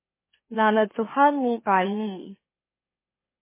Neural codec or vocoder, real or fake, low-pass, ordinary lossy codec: autoencoder, 44.1 kHz, a latent of 192 numbers a frame, MeloTTS; fake; 3.6 kHz; MP3, 16 kbps